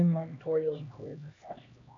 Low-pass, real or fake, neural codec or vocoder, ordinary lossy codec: 7.2 kHz; fake; codec, 16 kHz, 1 kbps, X-Codec, HuBERT features, trained on LibriSpeech; AAC, 64 kbps